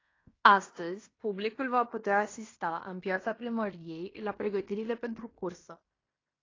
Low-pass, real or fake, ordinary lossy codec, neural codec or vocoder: 7.2 kHz; fake; AAC, 32 kbps; codec, 16 kHz in and 24 kHz out, 0.9 kbps, LongCat-Audio-Codec, fine tuned four codebook decoder